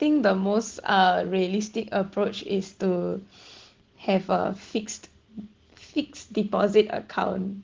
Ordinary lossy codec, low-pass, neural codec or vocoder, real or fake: Opus, 16 kbps; 7.2 kHz; none; real